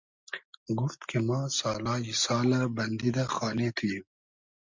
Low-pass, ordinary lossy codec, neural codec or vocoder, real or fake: 7.2 kHz; MP3, 64 kbps; none; real